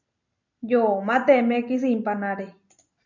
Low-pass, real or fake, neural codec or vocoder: 7.2 kHz; real; none